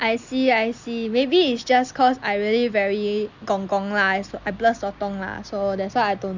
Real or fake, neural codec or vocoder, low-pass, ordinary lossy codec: real; none; 7.2 kHz; Opus, 64 kbps